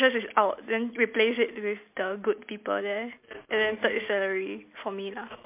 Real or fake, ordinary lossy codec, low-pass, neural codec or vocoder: real; MP3, 32 kbps; 3.6 kHz; none